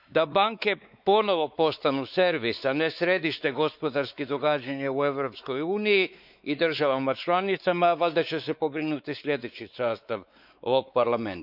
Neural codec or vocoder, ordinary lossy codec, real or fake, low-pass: codec, 16 kHz, 4 kbps, X-Codec, WavLM features, trained on Multilingual LibriSpeech; none; fake; 5.4 kHz